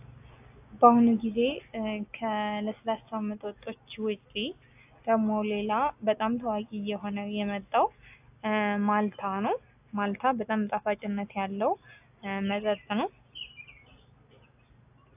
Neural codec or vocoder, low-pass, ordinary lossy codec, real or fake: none; 3.6 kHz; AAC, 32 kbps; real